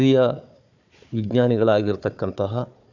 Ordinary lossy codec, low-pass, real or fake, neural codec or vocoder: none; 7.2 kHz; fake; codec, 16 kHz, 4 kbps, FunCodec, trained on Chinese and English, 50 frames a second